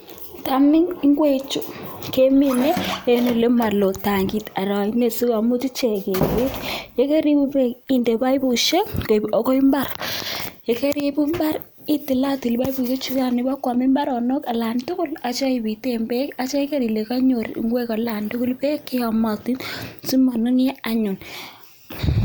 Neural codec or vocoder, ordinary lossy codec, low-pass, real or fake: none; none; none; real